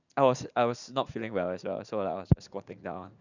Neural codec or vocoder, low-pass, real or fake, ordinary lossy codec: vocoder, 44.1 kHz, 80 mel bands, Vocos; 7.2 kHz; fake; none